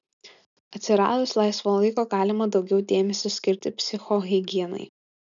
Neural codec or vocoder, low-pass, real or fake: none; 7.2 kHz; real